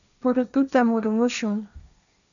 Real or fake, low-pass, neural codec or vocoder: fake; 7.2 kHz; codec, 16 kHz, 1.1 kbps, Voila-Tokenizer